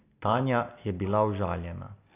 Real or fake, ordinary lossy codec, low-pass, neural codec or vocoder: real; AAC, 24 kbps; 3.6 kHz; none